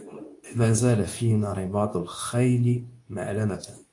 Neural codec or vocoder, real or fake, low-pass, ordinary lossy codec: codec, 24 kHz, 0.9 kbps, WavTokenizer, medium speech release version 2; fake; 10.8 kHz; AAC, 48 kbps